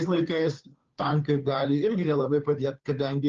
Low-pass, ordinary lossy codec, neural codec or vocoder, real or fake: 7.2 kHz; Opus, 24 kbps; codec, 16 kHz, 2 kbps, FunCodec, trained on Chinese and English, 25 frames a second; fake